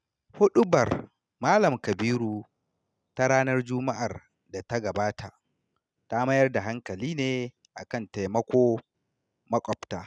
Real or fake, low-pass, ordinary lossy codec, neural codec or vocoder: real; none; none; none